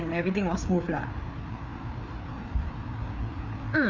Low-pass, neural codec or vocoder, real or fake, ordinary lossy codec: 7.2 kHz; codec, 16 kHz, 4 kbps, FreqCodec, larger model; fake; none